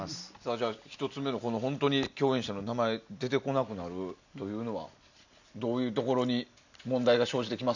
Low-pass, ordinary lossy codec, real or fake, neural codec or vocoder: 7.2 kHz; none; real; none